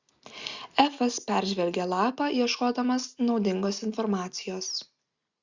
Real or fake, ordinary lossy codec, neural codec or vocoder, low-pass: real; Opus, 64 kbps; none; 7.2 kHz